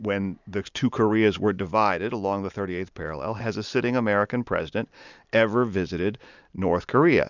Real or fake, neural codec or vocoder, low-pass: real; none; 7.2 kHz